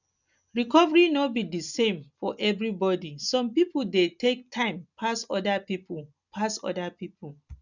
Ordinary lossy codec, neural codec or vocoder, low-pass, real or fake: none; none; 7.2 kHz; real